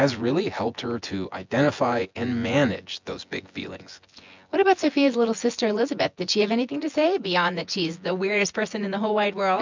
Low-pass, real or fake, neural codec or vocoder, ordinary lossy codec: 7.2 kHz; fake; vocoder, 24 kHz, 100 mel bands, Vocos; MP3, 64 kbps